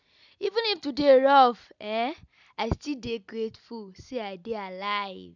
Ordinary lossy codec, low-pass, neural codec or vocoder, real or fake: none; 7.2 kHz; none; real